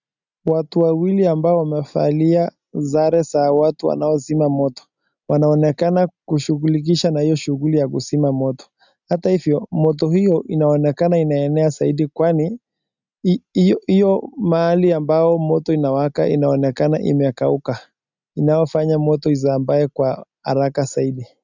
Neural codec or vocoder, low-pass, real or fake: none; 7.2 kHz; real